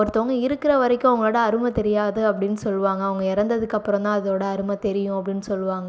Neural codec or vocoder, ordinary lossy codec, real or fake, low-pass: none; none; real; none